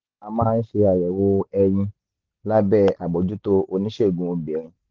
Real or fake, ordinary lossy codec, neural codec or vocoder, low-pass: real; Opus, 16 kbps; none; 7.2 kHz